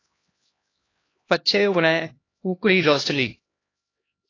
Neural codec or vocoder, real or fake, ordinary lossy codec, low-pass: codec, 16 kHz, 1 kbps, X-Codec, HuBERT features, trained on LibriSpeech; fake; AAC, 32 kbps; 7.2 kHz